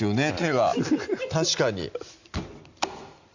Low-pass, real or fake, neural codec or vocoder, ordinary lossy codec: 7.2 kHz; real; none; Opus, 64 kbps